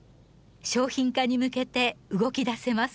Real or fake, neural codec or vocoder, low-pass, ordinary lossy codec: real; none; none; none